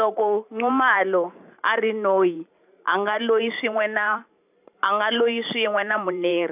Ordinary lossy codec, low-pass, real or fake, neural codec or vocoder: none; 3.6 kHz; fake; vocoder, 44.1 kHz, 128 mel bands every 512 samples, BigVGAN v2